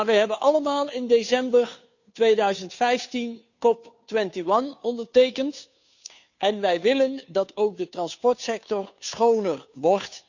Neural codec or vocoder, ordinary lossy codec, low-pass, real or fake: codec, 16 kHz, 2 kbps, FunCodec, trained on Chinese and English, 25 frames a second; none; 7.2 kHz; fake